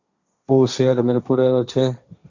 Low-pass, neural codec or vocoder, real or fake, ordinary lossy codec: 7.2 kHz; codec, 16 kHz, 1.1 kbps, Voila-Tokenizer; fake; Opus, 64 kbps